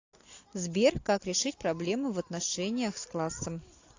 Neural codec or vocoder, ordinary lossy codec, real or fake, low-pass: none; AAC, 48 kbps; real; 7.2 kHz